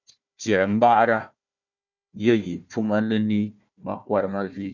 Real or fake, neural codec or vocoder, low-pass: fake; codec, 16 kHz, 1 kbps, FunCodec, trained on Chinese and English, 50 frames a second; 7.2 kHz